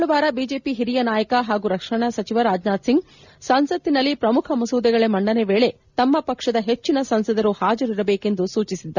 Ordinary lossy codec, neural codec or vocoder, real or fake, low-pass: none; none; real; 7.2 kHz